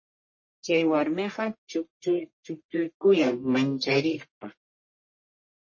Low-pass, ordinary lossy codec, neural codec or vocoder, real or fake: 7.2 kHz; MP3, 32 kbps; codec, 44.1 kHz, 1.7 kbps, Pupu-Codec; fake